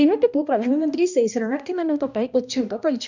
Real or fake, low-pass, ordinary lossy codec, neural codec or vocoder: fake; 7.2 kHz; none; codec, 16 kHz, 1 kbps, X-Codec, HuBERT features, trained on balanced general audio